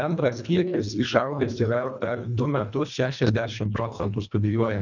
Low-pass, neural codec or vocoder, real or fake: 7.2 kHz; codec, 24 kHz, 1.5 kbps, HILCodec; fake